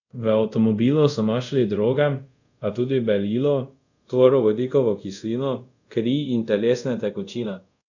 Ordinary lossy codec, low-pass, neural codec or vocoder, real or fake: none; 7.2 kHz; codec, 24 kHz, 0.5 kbps, DualCodec; fake